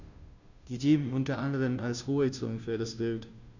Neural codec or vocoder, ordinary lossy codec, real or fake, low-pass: codec, 16 kHz, 0.5 kbps, FunCodec, trained on Chinese and English, 25 frames a second; none; fake; 7.2 kHz